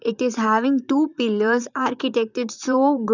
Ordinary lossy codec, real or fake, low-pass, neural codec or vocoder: none; fake; 7.2 kHz; vocoder, 44.1 kHz, 128 mel bands, Pupu-Vocoder